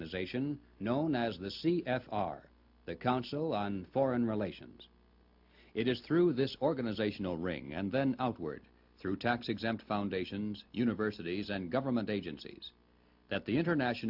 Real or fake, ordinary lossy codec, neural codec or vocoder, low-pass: real; AAC, 48 kbps; none; 5.4 kHz